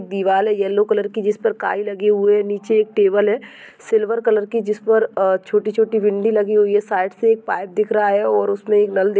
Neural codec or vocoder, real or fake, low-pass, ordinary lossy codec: none; real; none; none